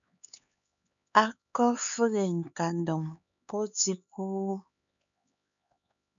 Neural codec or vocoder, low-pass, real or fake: codec, 16 kHz, 4 kbps, X-Codec, HuBERT features, trained on LibriSpeech; 7.2 kHz; fake